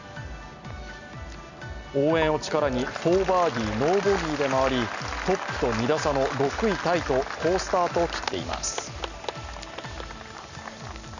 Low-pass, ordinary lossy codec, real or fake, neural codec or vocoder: 7.2 kHz; none; real; none